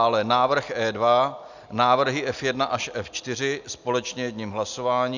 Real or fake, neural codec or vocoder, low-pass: real; none; 7.2 kHz